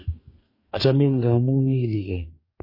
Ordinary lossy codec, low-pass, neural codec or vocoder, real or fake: MP3, 24 kbps; 5.4 kHz; codec, 44.1 kHz, 2.6 kbps, DAC; fake